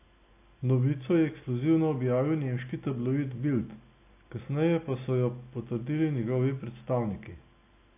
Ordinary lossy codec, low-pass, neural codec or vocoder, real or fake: AAC, 24 kbps; 3.6 kHz; none; real